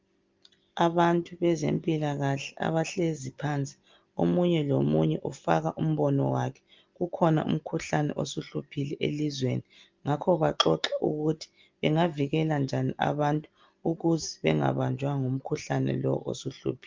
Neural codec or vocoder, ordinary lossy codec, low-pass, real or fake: none; Opus, 24 kbps; 7.2 kHz; real